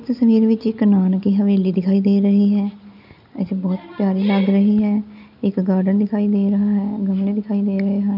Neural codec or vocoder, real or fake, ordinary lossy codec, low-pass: none; real; none; 5.4 kHz